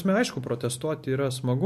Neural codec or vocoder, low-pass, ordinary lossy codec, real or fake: none; 14.4 kHz; MP3, 64 kbps; real